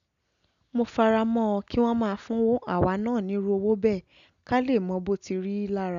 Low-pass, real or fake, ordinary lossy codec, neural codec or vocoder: 7.2 kHz; real; none; none